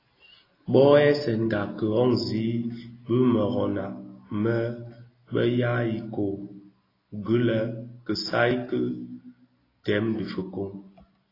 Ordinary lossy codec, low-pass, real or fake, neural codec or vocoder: AAC, 24 kbps; 5.4 kHz; real; none